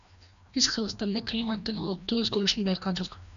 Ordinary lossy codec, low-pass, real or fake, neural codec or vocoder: AAC, 96 kbps; 7.2 kHz; fake; codec, 16 kHz, 1 kbps, FreqCodec, larger model